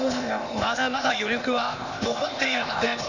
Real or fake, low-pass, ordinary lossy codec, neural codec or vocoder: fake; 7.2 kHz; none; codec, 16 kHz, 0.8 kbps, ZipCodec